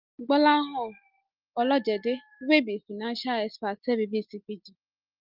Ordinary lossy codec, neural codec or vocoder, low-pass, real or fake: Opus, 24 kbps; none; 5.4 kHz; real